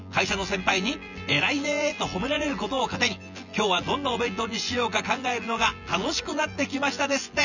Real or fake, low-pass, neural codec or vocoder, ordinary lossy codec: fake; 7.2 kHz; vocoder, 24 kHz, 100 mel bands, Vocos; none